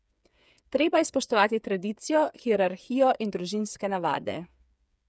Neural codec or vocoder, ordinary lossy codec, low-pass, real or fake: codec, 16 kHz, 8 kbps, FreqCodec, smaller model; none; none; fake